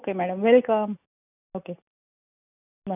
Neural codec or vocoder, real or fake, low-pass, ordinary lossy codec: none; real; 3.6 kHz; none